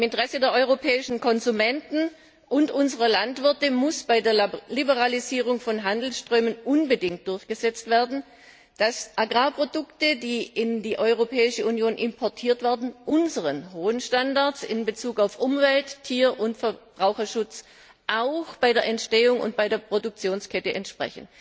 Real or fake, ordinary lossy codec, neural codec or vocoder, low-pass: real; none; none; none